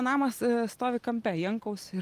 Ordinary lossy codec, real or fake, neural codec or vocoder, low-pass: Opus, 32 kbps; real; none; 14.4 kHz